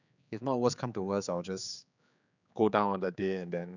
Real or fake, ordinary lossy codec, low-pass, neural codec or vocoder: fake; none; 7.2 kHz; codec, 16 kHz, 4 kbps, X-Codec, HuBERT features, trained on general audio